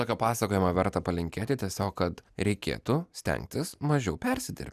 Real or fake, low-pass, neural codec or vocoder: real; 14.4 kHz; none